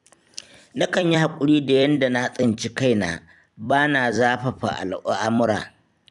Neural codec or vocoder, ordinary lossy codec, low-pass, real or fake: vocoder, 44.1 kHz, 128 mel bands every 256 samples, BigVGAN v2; none; 10.8 kHz; fake